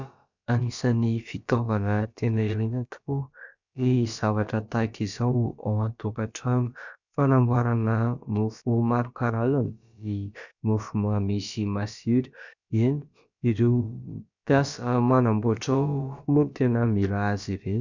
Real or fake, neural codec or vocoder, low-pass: fake; codec, 16 kHz, about 1 kbps, DyCAST, with the encoder's durations; 7.2 kHz